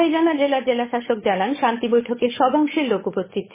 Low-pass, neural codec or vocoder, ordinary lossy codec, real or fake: 3.6 kHz; codec, 16 kHz, 8 kbps, FunCodec, trained on Chinese and English, 25 frames a second; MP3, 16 kbps; fake